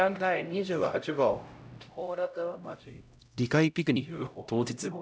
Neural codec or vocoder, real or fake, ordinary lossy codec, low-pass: codec, 16 kHz, 0.5 kbps, X-Codec, HuBERT features, trained on LibriSpeech; fake; none; none